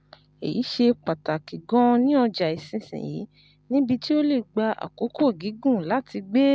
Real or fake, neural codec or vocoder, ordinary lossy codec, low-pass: real; none; none; none